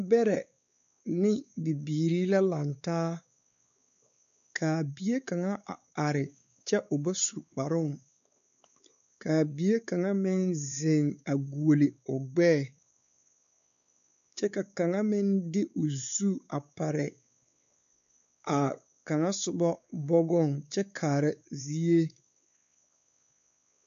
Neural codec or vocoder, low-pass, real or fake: codec, 16 kHz, 4 kbps, X-Codec, WavLM features, trained on Multilingual LibriSpeech; 7.2 kHz; fake